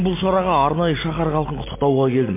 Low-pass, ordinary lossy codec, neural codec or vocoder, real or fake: 3.6 kHz; MP3, 24 kbps; none; real